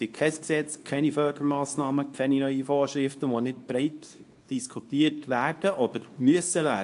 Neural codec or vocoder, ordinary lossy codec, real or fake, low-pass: codec, 24 kHz, 0.9 kbps, WavTokenizer, small release; AAC, 64 kbps; fake; 10.8 kHz